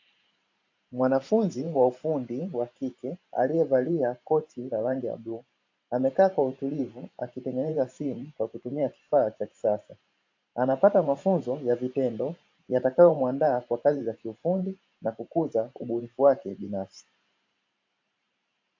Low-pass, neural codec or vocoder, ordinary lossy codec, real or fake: 7.2 kHz; vocoder, 44.1 kHz, 128 mel bands every 512 samples, BigVGAN v2; AAC, 48 kbps; fake